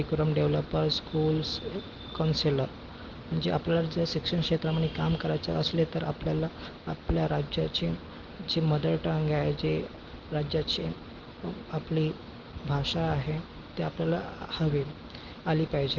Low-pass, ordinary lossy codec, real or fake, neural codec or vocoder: 7.2 kHz; Opus, 16 kbps; real; none